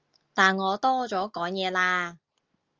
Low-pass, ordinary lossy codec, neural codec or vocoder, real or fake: 7.2 kHz; Opus, 24 kbps; none; real